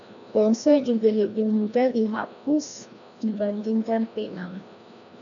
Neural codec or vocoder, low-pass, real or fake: codec, 16 kHz, 1 kbps, FreqCodec, larger model; 7.2 kHz; fake